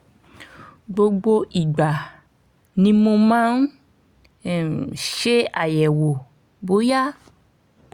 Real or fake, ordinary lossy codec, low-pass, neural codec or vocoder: real; Opus, 64 kbps; 19.8 kHz; none